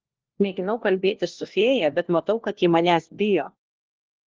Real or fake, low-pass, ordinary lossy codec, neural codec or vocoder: fake; 7.2 kHz; Opus, 16 kbps; codec, 16 kHz, 1 kbps, FunCodec, trained on LibriTTS, 50 frames a second